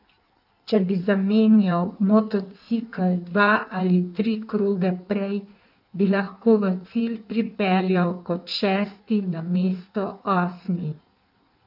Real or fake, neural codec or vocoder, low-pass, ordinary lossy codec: fake; codec, 16 kHz in and 24 kHz out, 1.1 kbps, FireRedTTS-2 codec; 5.4 kHz; none